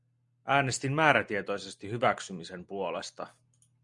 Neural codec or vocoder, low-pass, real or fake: none; 10.8 kHz; real